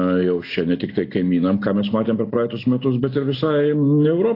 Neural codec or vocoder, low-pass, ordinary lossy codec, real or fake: none; 5.4 kHz; AAC, 32 kbps; real